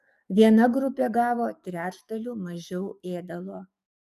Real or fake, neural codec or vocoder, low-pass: fake; codec, 44.1 kHz, 7.8 kbps, DAC; 14.4 kHz